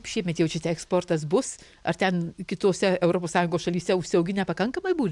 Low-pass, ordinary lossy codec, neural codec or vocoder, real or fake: 10.8 kHz; MP3, 96 kbps; none; real